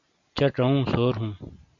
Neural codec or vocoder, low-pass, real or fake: none; 7.2 kHz; real